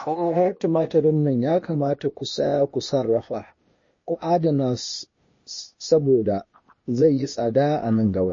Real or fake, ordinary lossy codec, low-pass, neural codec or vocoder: fake; MP3, 32 kbps; 7.2 kHz; codec, 16 kHz, 0.8 kbps, ZipCodec